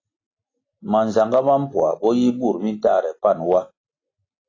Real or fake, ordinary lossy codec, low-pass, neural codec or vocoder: real; AAC, 32 kbps; 7.2 kHz; none